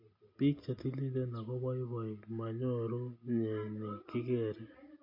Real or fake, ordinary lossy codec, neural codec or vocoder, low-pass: real; MP3, 32 kbps; none; 5.4 kHz